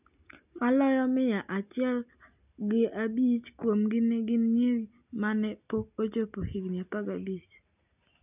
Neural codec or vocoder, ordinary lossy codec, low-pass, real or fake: none; none; 3.6 kHz; real